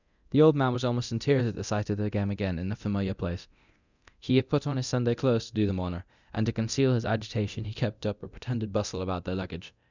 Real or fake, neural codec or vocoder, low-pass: fake; codec, 24 kHz, 0.9 kbps, DualCodec; 7.2 kHz